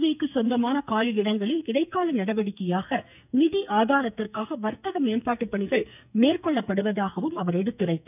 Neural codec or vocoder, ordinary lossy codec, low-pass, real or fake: codec, 44.1 kHz, 2.6 kbps, SNAC; none; 3.6 kHz; fake